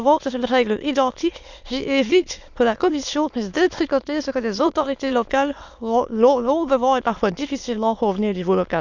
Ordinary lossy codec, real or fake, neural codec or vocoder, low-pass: none; fake; autoencoder, 22.05 kHz, a latent of 192 numbers a frame, VITS, trained on many speakers; 7.2 kHz